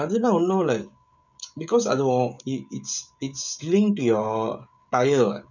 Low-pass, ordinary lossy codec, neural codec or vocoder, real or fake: 7.2 kHz; none; codec, 16 kHz, 16 kbps, FreqCodec, smaller model; fake